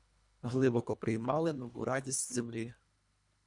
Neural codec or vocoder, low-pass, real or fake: codec, 24 kHz, 1.5 kbps, HILCodec; 10.8 kHz; fake